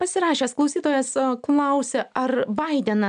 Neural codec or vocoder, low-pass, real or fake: none; 9.9 kHz; real